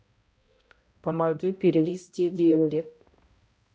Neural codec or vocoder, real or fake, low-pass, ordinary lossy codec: codec, 16 kHz, 0.5 kbps, X-Codec, HuBERT features, trained on balanced general audio; fake; none; none